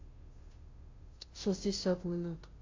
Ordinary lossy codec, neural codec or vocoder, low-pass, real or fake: AAC, 32 kbps; codec, 16 kHz, 0.5 kbps, FunCodec, trained on Chinese and English, 25 frames a second; 7.2 kHz; fake